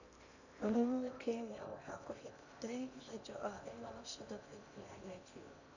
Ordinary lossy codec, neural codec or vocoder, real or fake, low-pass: none; codec, 16 kHz in and 24 kHz out, 0.8 kbps, FocalCodec, streaming, 65536 codes; fake; 7.2 kHz